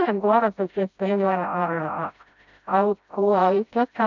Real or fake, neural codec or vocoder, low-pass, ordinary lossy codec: fake; codec, 16 kHz, 0.5 kbps, FreqCodec, smaller model; 7.2 kHz; none